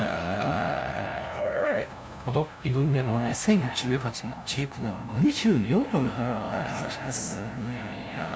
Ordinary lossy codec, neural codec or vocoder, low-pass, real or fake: none; codec, 16 kHz, 0.5 kbps, FunCodec, trained on LibriTTS, 25 frames a second; none; fake